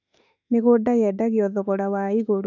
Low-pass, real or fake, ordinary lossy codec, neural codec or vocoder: 7.2 kHz; fake; none; autoencoder, 48 kHz, 32 numbers a frame, DAC-VAE, trained on Japanese speech